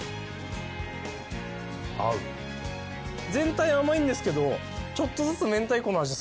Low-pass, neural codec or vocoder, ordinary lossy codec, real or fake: none; none; none; real